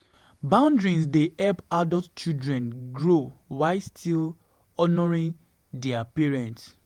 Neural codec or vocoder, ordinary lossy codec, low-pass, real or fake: vocoder, 48 kHz, 128 mel bands, Vocos; Opus, 32 kbps; 19.8 kHz; fake